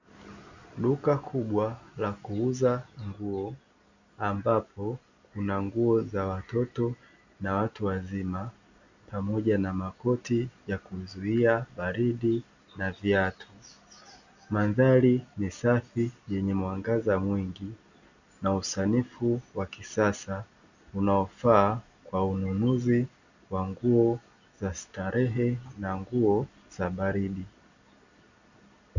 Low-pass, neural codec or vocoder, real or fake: 7.2 kHz; none; real